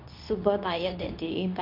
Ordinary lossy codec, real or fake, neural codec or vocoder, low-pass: MP3, 48 kbps; fake; codec, 24 kHz, 0.9 kbps, WavTokenizer, medium speech release version 2; 5.4 kHz